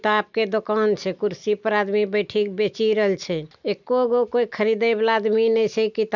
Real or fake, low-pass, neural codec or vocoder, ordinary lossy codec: real; 7.2 kHz; none; none